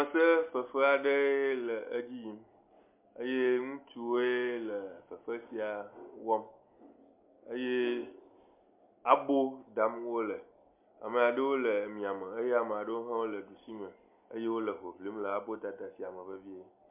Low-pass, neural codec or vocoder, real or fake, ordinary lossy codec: 3.6 kHz; none; real; MP3, 32 kbps